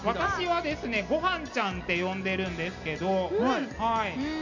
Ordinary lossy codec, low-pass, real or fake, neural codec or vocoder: none; 7.2 kHz; real; none